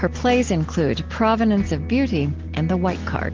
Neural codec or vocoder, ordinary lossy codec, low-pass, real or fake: none; Opus, 16 kbps; 7.2 kHz; real